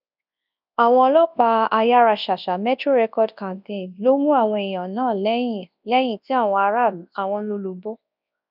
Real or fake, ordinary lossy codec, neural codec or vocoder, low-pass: fake; none; codec, 24 kHz, 0.9 kbps, WavTokenizer, large speech release; 5.4 kHz